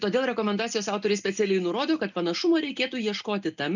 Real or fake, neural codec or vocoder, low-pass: real; none; 7.2 kHz